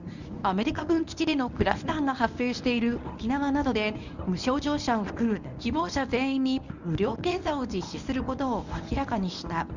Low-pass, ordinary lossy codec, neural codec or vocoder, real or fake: 7.2 kHz; none; codec, 24 kHz, 0.9 kbps, WavTokenizer, medium speech release version 1; fake